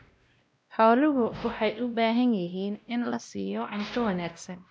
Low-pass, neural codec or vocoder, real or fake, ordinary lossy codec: none; codec, 16 kHz, 1 kbps, X-Codec, WavLM features, trained on Multilingual LibriSpeech; fake; none